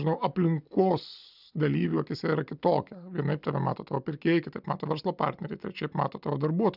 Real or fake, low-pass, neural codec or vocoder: real; 5.4 kHz; none